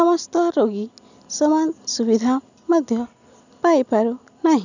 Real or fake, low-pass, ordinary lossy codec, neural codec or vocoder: real; 7.2 kHz; none; none